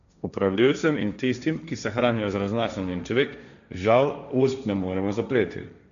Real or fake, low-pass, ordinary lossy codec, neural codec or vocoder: fake; 7.2 kHz; none; codec, 16 kHz, 1.1 kbps, Voila-Tokenizer